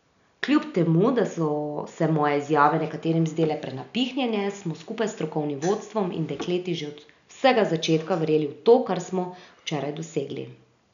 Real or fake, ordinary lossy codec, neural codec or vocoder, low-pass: real; none; none; 7.2 kHz